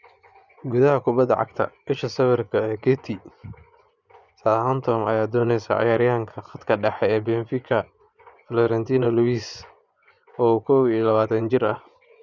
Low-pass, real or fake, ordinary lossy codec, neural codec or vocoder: 7.2 kHz; fake; none; vocoder, 24 kHz, 100 mel bands, Vocos